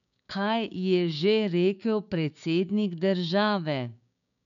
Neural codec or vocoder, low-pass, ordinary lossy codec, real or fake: codec, 16 kHz, 6 kbps, DAC; 7.2 kHz; none; fake